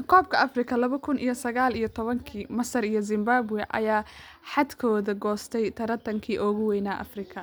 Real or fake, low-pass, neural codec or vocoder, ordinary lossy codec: real; none; none; none